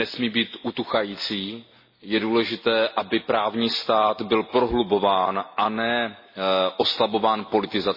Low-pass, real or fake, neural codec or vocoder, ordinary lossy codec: 5.4 kHz; real; none; MP3, 24 kbps